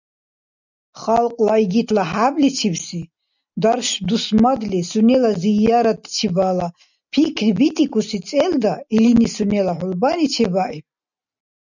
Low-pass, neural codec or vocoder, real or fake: 7.2 kHz; none; real